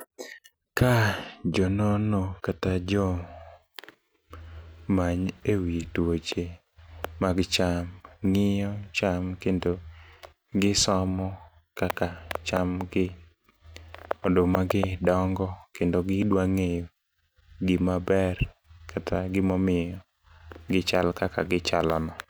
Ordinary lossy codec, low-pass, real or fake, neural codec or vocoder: none; none; real; none